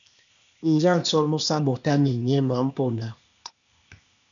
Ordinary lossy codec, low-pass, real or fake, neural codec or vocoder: MP3, 96 kbps; 7.2 kHz; fake; codec, 16 kHz, 0.8 kbps, ZipCodec